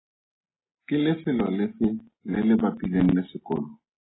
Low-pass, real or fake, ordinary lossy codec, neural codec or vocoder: 7.2 kHz; real; AAC, 16 kbps; none